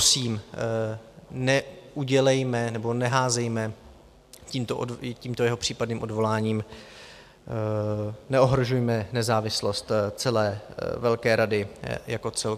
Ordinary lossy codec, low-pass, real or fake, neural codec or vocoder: MP3, 96 kbps; 14.4 kHz; real; none